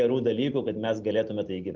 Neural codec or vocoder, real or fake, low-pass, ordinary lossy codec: none; real; 7.2 kHz; Opus, 32 kbps